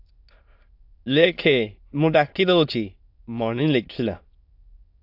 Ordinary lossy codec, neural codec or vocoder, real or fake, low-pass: AAC, 48 kbps; autoencoder, 22.05 kHz, a latent of 192 numbers a frame, VITS, trained on many speakers; fake; 5.4 kHz